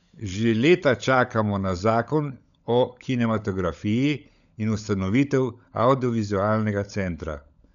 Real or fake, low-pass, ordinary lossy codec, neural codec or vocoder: fake; 7.2 kHz; none; codec, 16 kHz, 16 kbps, FunCodec, trained on LibriTTS, 50 frames a second